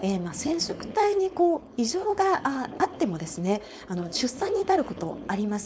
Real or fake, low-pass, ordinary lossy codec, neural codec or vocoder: fake; none; none; codec, 16 kHz, 4.8 kbps, FACodec